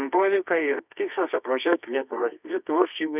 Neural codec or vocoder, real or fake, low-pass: codec, 24 kHz, 0.9 kbps, WavTokenizer, medium music audio release; fake; 3.6 kHz